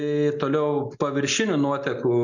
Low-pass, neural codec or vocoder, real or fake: 7.2 kHz; none; real